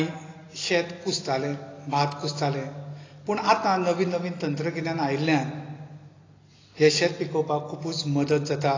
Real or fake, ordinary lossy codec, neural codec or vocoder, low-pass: real; AAC, 32 kbps; none; 7.2 kHz